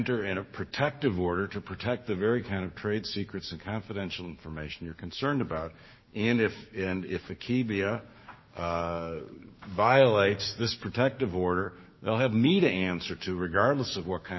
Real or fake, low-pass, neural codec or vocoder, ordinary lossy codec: fake; 7.2 kHz; codec, 16 kHz, 6 kbps, DAC; MP3, 24 kbps